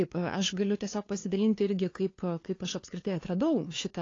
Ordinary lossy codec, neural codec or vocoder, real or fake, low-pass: AAC, 32 kbps; codec, 16 kHz, 2 kbps, FunCodec, trained on LibriTTS, 25 frames a second; fake; 7.2 kHz